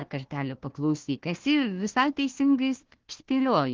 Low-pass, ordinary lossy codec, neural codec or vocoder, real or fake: 7.2 kHz; Opus, 16 kbps; codec, 16 kHz, 1 kbps, FunCodec, trained on Chinese and English, 50 frames a second; fake